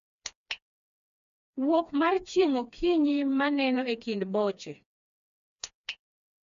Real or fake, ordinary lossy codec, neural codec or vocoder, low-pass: fake; AAC, 96 kbps; codec, 16 kHz, 2 kbps, FreqCodec, smaller model; 7.2 kHz